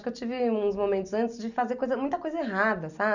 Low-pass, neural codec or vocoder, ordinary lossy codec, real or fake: 7.2 kHz; none; none; real